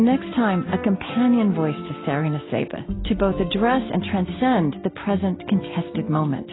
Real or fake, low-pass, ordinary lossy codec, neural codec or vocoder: real; 7.2 kHz; AAC, 16 kbps; none